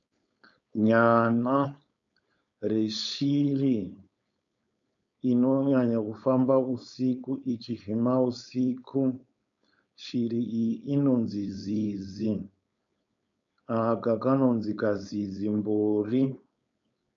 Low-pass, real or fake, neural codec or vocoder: 7.2 kHz; fake; codec, 16 kHz, 4.8 kbps, FACodec